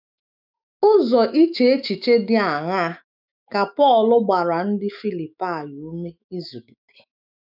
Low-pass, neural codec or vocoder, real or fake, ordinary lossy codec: 5.4 kHz; autoencoder, 48 kHz, 128 numbers a frame, DAC-VAE, trained on Japanese speech; fake; none